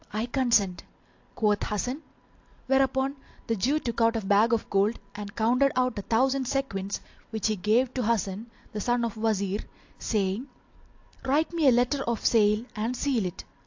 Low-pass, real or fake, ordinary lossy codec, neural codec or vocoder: 7.2 kHz; real; AAC, 48 kbps; none